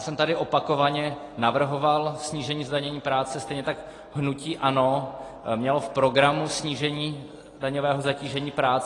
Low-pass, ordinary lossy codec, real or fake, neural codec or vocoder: 10.8 kHz; AAC, 32 kbps; real; none